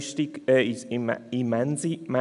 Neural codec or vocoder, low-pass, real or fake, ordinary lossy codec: none; 10.8 kHz; real; none